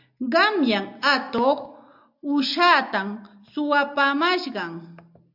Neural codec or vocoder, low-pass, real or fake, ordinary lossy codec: none; 5.4 kHz; real; AAC, 48 kbps